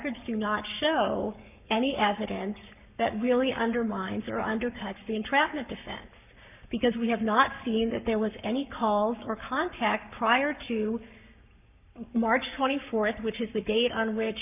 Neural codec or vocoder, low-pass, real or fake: codec, 44.1 kHz, 7.8 kbps, Pupu-Codec; 3.6 kHz; fake